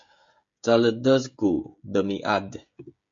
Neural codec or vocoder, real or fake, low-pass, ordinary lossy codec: codec, 16 kHz, 16 kbps, FreqCodec, smaller model; fake; 7.2 kHz; MP3, 48 kbps